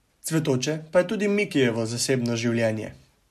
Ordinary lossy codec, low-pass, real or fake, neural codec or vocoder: MP3, 64 kbps; 14.4 kHz; real; none